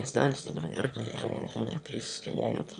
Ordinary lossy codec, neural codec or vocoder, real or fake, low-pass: AAC, 96 kbps; autoencoder, 22.05 kHz, a latent of 192 numbers a frame, VITS, trained on one speaker; fake; 9.9 kHz